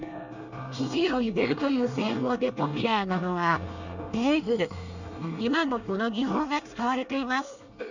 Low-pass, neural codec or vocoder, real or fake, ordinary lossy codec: 7.2 kHz; codec, 24 kHz, 1 kbps, SNAC; fake; none